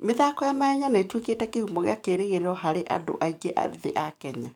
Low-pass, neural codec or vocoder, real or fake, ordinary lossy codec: 19.8 kHz; codec, 44.1 kHz, 7.8 kbps, DAC; fake; none